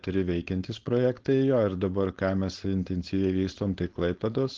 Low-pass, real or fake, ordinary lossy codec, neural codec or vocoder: 7.2 kHz; fake; Opus, 16 kbps; codec, 16 kHz, 4.8 kbps, FACodec